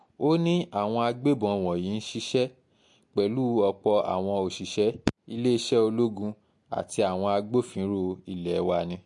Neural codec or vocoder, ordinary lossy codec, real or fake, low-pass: none; MP3, 48 kbps; real; 10.8 kHz